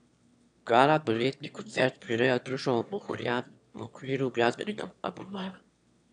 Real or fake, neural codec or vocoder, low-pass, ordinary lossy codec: fake; autoencoder, 22.05 kHz, a latent of 192 numbers a frame, VITS, trained on one speaker; 9.9 kHz; none